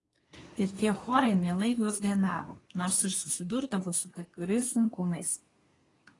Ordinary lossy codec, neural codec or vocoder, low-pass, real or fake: AAC, 32 kbps; codec, 24 kHz, 1 kbps, SNAC; 10.8 kHz; fake